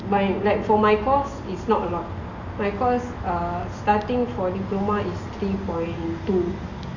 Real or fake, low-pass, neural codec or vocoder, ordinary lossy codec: real; 7.2 kHz; none; none